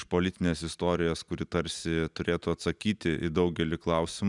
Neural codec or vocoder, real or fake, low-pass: none; real; 10.8 kHz